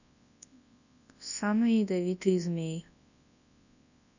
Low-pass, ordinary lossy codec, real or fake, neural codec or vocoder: 7.2 kHz; none; fake; codec, 24 kHz, 0.9 kbps, WavTokenizer, large speech release